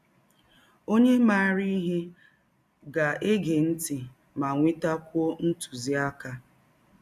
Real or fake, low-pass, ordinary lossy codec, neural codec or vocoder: real; 14.4 kHz; none; none